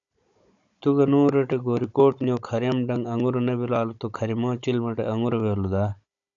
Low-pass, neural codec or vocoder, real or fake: 7.2 kHz; codec, 16 kHz, 16 kbps, FunCodec, trained on Chinese and English, 50 frames a second; fake